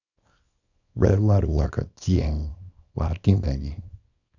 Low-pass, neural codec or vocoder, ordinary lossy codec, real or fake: 7.2 kHz; codec, 24 kHz, 0.9 kbps, WavTokenizer, small release; none; fake